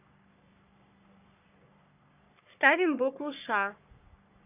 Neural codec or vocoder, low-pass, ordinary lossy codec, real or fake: codec, 44.1 kHz, 3.4 kbps, Pupu-Codec; 3.6 kHz; none; fake